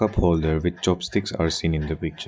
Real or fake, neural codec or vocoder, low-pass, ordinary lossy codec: real; none; none; none